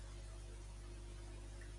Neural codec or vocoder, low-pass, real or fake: none; 10.8 kHz; real